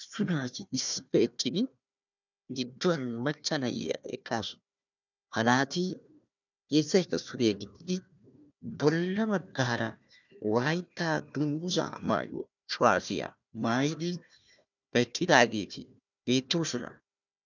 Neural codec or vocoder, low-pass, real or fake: codec, 16 kHz, 1 kbps, FunCodec, trained on Chinese and English, 50 frames a second; 7.2 kHz; fake